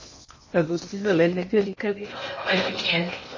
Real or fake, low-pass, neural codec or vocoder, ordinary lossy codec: fake; 7.2 kHz; codec, 16 kHz in and 24 kHz out, 0.8 kbps, FocalCodec, streaming, 65536 codes; MP3, 32 kbps